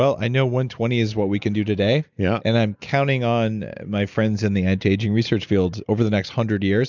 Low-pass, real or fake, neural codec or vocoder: 7.2 kHz; real; none